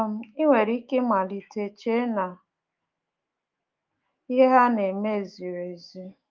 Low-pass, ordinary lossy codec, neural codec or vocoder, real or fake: 7.2 kHz; Opus, 32 kbps; autoencoder, 48 kHz, 128 numbers a frame, DAC-VAE, trained on Japanese speech; fake